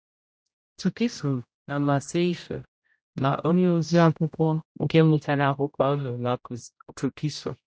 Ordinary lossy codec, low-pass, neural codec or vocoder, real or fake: none; none; codec, 16 kHz, 0.5 kbps, X-Codec, HuBERT features, trained on general audio; fake